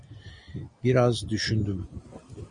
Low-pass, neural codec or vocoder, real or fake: 9.9 kHz; none; real